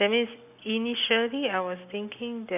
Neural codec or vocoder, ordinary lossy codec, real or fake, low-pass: none; none; real; 3.6 kHz